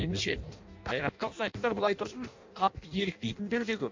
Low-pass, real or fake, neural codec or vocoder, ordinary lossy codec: 7.2 kHz; fake; codec, 16 kHz in and 24 kHz out, 0.6 kbps, FireRedTTS-2 codec; MP3, 48 kbps